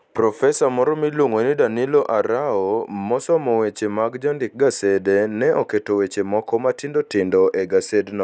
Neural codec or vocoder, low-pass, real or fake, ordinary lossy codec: none; none; real; none